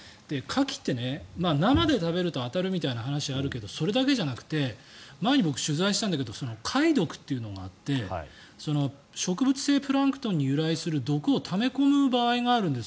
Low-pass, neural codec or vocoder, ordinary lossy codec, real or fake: none; none; none; real